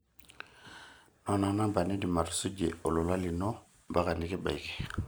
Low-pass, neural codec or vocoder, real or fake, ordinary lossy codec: none; none; real; none